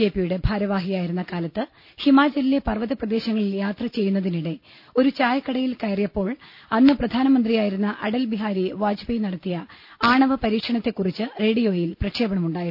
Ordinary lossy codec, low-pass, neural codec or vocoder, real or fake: none; 5.4 kHz; none; real